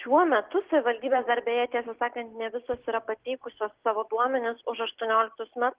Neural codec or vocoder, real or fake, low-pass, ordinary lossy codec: none; real; 3.6 kHz; Opus, 16 kbps